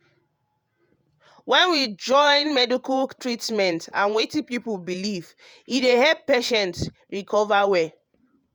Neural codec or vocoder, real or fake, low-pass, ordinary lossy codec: vocoder, 48 kHz, 128 mel bands, Vocos; fake; none; none